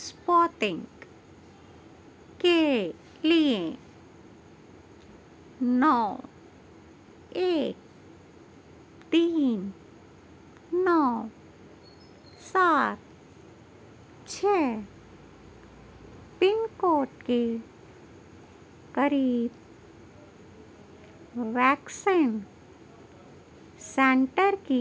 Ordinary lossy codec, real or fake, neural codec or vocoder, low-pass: none; real; none; none